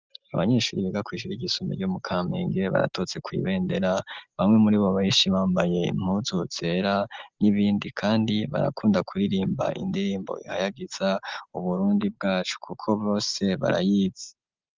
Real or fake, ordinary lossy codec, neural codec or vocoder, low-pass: real; Opus, 32 kbps; none; 7.2 kHz